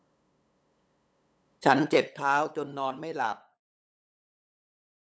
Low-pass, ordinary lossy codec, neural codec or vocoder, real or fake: none; none; codec, 16 kHz, 8 kbps, FunCodec, trained on LibriTTS, 25 frames a second; fake